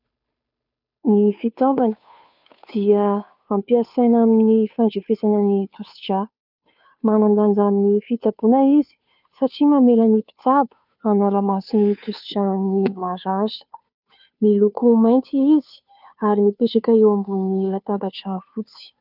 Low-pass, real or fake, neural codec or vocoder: 5.4 kHz; fake; codec, 16 kHz, 2 kbps, FunCodec, trained on Chinese and English, 25 frames a second